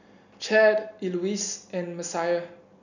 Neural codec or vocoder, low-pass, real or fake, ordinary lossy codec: none; 7.2 kHz; real; none